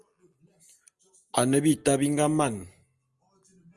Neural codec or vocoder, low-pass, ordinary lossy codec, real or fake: none; 10.8 kHz; Opus, 32 kbps; real